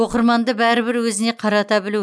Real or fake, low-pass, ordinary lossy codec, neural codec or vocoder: real; none; none; none